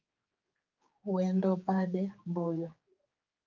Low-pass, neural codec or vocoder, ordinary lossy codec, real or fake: 7.2 kHz; codec, 16 kHz, 4 kbps, X-Codec, HuBERT features, trained on general audio; Opus, 24 kbps; fake